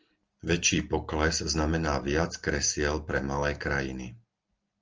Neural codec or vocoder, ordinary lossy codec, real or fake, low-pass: none; Opus, 24 kbps; real; 7.2 kHz